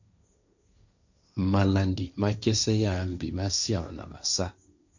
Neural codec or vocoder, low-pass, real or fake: codec, 16 kHz, 1.1 kbps, Voila-Tokenizer; 7.2 kHz; fake